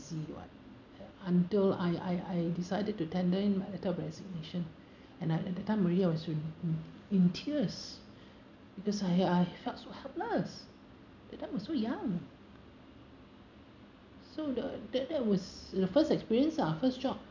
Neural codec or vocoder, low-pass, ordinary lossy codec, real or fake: none; 7.2 kHz; none; real